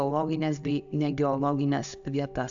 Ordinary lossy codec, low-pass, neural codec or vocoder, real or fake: AAC, 64 kbps; 7.2 kHz; none; real